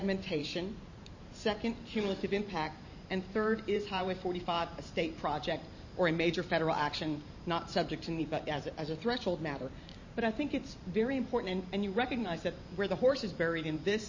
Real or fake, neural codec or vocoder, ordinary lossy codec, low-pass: fake; vocoder, 44.1 kHz, 128 mel bands every 512 samples, BigVGAN v2; MP3, 32 kbps; 7.2 kHz